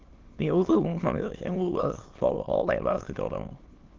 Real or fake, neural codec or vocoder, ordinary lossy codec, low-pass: fake; autoencoder, 22.05 kHz, a latent of 192 numbers a frame, VITS, trained on many speakers; Opus, 16 kbps; 7.2 kHz